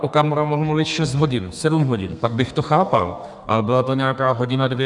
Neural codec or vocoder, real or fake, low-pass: codec, 32 kHz, 1.9 kbps, SNAC; fake; 10.8 kHz